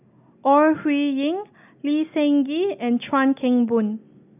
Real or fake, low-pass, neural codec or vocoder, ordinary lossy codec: real; 3.6 kHz; none; none